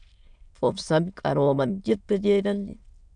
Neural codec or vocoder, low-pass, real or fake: autoencoder, 22.05 kHz, a latent of 192 numbers a frame, VITS, trained on many speakers; 9.9 kHz; fake